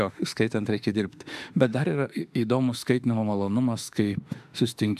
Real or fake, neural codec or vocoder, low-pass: fake; autoencoder, 48 kHz, 32 numbers a frame, DAC-VAE, trained on Japanese speech; 14.4 kHz